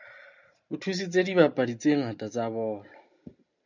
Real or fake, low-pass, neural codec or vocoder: real; 7.2 kHz; none